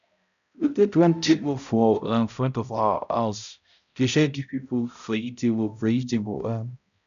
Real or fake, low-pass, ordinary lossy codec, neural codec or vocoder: fake; 7.2 kHz; none; codec, 16 kHz, 0.5 kbps, X-Codec, HuBERT features, trained on balanced general audio